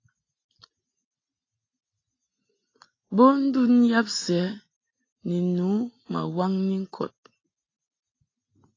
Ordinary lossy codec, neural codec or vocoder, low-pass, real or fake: AAC, 32 kbps; none; 7.2 kHz; real